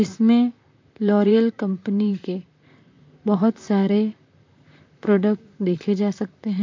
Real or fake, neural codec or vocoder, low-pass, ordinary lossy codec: fake; vocoder, 44.1 kHz, 128 mel bands, Pupu-Vocoder; 7.2 kHz; MP3, 48 kbps